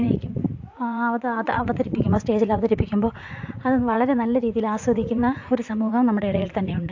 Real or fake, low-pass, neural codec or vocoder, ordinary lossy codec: fake; 7.2 kHz; vocoder, 44.1 kHz, 80 mel bands, Vocos; AAC, 48 kbps